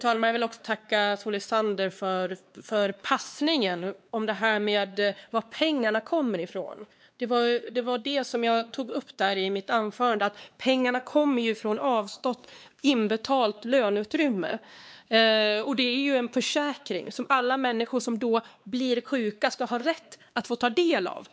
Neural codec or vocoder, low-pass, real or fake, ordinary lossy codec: codec, 16 kHz, 2 kbps, X-Codec, WavLM features, trained on Multilingual LibriSpeech; none; fake; none